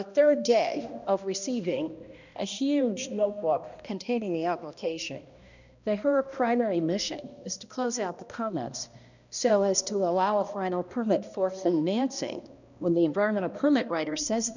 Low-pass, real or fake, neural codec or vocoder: 7.2 kHz; fake; codec, 16 kHz, 1 kbps, X-Codec, HuBERT features, trained on balanced general audio